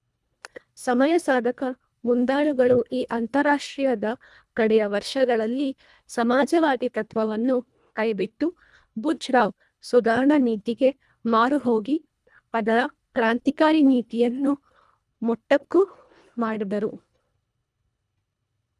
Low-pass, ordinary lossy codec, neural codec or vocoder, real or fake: none; none; codec, 24 kHz, 1.5 kbps, HILCodec; fake